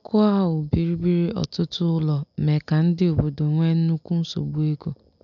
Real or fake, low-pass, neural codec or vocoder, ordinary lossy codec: real; 7.2 kHz; none; none